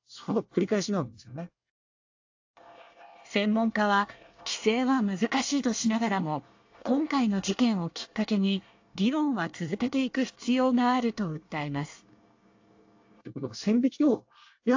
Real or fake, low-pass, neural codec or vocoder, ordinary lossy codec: fake; 7.2 kHz; codec, 24 kHz, 1 kbps, SNAC; AAC, 48 kbps